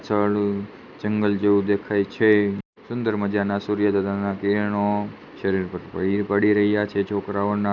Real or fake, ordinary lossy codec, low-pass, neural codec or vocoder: real; none; 7.2 kHz; none